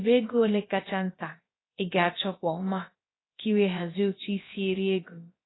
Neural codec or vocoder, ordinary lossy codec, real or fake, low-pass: codec, 16 kHz, about 1 kbps, DyCAST, with the encoder's durations; AAC, 16 kbps; fake; 7.2 kHz